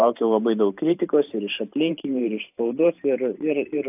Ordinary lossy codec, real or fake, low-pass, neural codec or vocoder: AAC, 32 kbps; fake; 3.6 kHz; vocoder, 44.1 kHz, 128 mel bands every 512 samples, BigVGAN v2